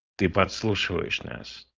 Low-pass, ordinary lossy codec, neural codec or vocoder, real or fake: 7.2 kHz; Opus, 32 kbps; codec, 16 kHz, 4.8 kbps, FACodec; fake